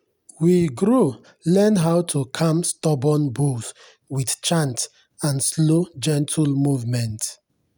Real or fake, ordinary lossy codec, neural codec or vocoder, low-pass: real; none; none; none